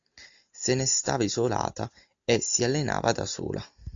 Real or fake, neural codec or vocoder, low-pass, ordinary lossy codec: real; none; 7.2 kHz; AAC, 64 kbps